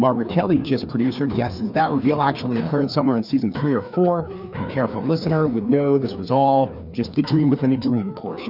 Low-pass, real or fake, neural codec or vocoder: 5.4 kHz; fake; codec, 16 kHz, 2 kbps, FreqCodec, larger model